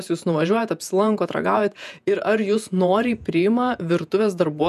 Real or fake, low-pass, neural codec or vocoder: fake; 14.4 kHz; vocoder, 48 kHz, 128 mel bands, Vocos